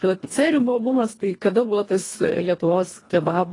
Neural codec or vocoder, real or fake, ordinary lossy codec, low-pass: codec, 24 kHz, 1.5 kbps, HILCodec; fake; AAC, 32 kbps; 10.8 kHz